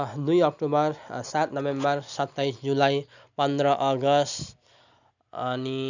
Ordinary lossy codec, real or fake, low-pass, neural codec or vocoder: none; real; 7.2 kHz; none